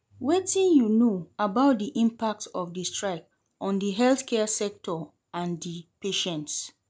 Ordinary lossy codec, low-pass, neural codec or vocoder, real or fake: none; none; none; real